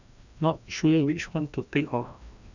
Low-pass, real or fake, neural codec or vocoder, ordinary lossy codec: 7.2 kHz; fake; codec, 16 kHz, 1 kbps, FreqCodec, larger model; none